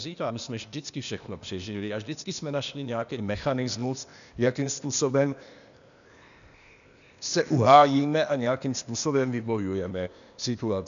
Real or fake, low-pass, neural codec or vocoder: fake; 7.2 kHz; codec, 16 kHz, 0.8 kbps, ZipCodec